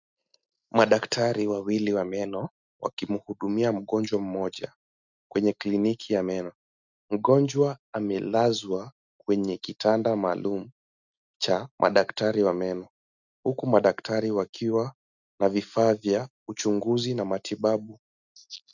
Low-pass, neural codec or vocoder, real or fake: 7.2 kHz; none; real